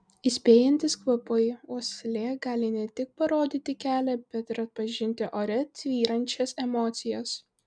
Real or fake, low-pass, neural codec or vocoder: real; 9.9 kHz; none